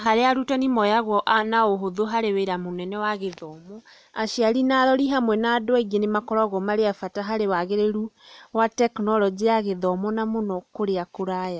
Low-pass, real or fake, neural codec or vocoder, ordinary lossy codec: none; real; none; none